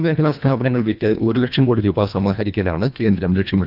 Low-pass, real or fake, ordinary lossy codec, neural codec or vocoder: 5.4 kHz; fake; none; codec, 24 kHz, 1.5 kbps, HILCodec